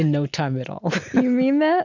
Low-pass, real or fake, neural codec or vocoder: 7.2 kHz; real; none